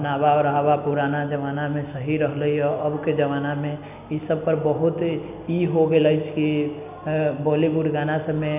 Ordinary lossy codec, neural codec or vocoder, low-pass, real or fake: none; none; 3.6 kHz; real